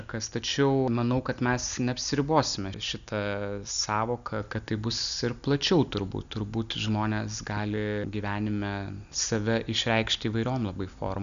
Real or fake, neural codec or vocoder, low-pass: real; none; 7.2 kHz